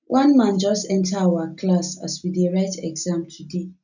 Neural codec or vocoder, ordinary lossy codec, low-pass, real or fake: none; none; 7.2 kHz; real